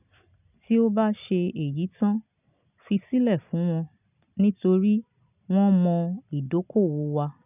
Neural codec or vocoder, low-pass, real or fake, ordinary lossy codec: none; 3.6 kHz; real; none